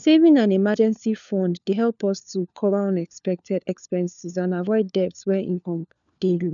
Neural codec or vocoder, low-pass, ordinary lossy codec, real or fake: codec, 16 kHz, 4 kbps, FunCodec, trained on LibriTTS, 50 frames a second; 7.2 kHz; none; fake